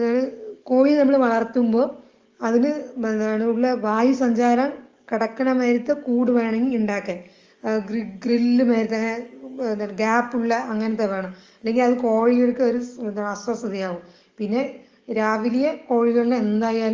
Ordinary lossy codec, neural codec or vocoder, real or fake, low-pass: Opus, 32 kbps; codec, 44.1 kHz, 7.8 kbps, DAC; fake; 7.2 kHz